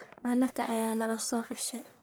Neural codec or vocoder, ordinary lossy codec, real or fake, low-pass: codec, 44.1 kHz, 1.7 kbps, Pupu-Codec; none; fake; none